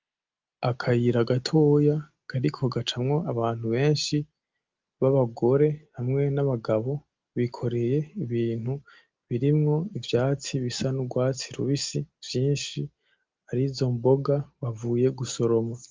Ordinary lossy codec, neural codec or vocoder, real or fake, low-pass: Opus, 32 kbps; none; real; 7.2 kHz